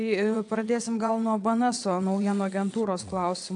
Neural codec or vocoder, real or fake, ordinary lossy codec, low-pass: vocoder, 22.05 kHz, 80 mel bands, WaveNeXt; fake; AAC, 64 kbps; 9.9 kHz